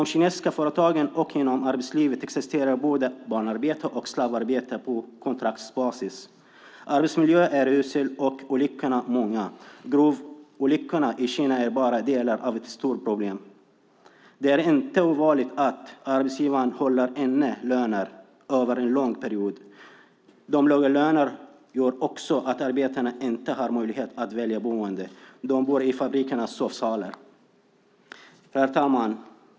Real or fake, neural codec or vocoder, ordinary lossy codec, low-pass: real; none; none; none